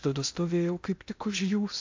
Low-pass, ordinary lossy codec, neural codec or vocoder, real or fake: 7.2 kHz; MP3, 64 kbps; codec, 16 kHz in and 24 kHz out, 0.8 kbps, FocalCodec, streaming, 65536 codes; fake